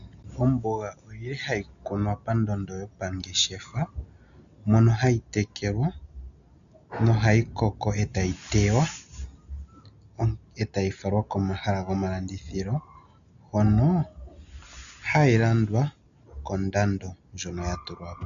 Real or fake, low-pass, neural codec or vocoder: real; 7.2 kHz; none